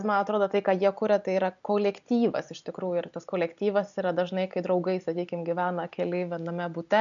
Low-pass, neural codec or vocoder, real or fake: 7.2 kHz; none; real